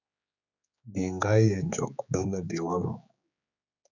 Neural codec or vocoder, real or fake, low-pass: codec, 16 kHz, 4 kbps, X-Codec, HuBERT features, trained on general audio; fake; 7.2 kHz